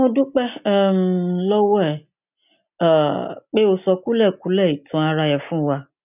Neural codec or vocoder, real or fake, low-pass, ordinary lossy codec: none; real; 3.6 kHz; none